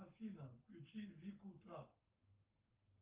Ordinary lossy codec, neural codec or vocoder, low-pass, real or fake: Opus, 32 kbps; codec, 44.1 kHz, 7.8 kbps, Pupu-Codec; 3.6 kHz; fake